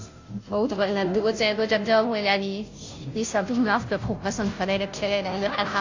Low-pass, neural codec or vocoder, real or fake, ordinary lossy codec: 7.2 kHz; codec, 16 kHz, 0.5 kbps, FunCodec, trained on Chinese and English, 25 frames a second; fake; AAC, 48 kbps